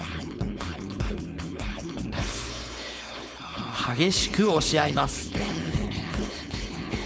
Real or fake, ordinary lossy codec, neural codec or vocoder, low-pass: fake; none; codec, 16 kHz, 4.8 kbps, FACodec; none